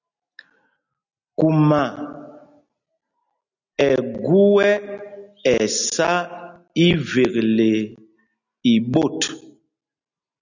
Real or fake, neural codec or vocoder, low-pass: real; none; 7.2 kHz